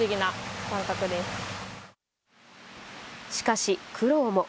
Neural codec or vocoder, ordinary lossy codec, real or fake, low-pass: none; none; real; none